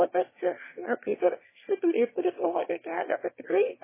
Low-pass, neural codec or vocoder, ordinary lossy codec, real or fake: 3.6 kHz; autoencoder, 22.05 kHz, a latent of 192 numbers a frame, VITS, trained on one speaker; MP3, 16 kbps; fake